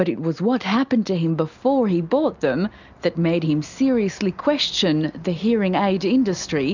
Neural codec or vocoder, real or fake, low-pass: none; real; 7.2 kHz